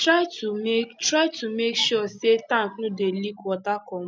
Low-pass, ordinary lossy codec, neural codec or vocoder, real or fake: none; none; none; real